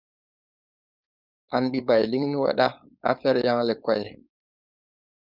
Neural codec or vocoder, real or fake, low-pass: codec, 16 kHz, 4.8 kbps, FACodec; fake; 5.4 kHz